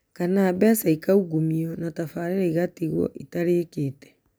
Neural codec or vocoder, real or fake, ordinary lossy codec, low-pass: none; real; none; none